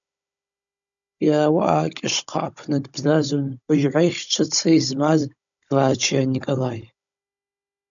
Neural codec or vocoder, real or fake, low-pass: codec, 16 kHz, 16 kbps, FunCodec, trained on Chinese and English, 50 frames a second; fake; 7.2 kHz